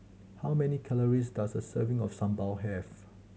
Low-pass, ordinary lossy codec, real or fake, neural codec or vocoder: none; none; real; none